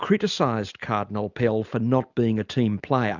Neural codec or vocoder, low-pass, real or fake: none; 7.2 kHz; real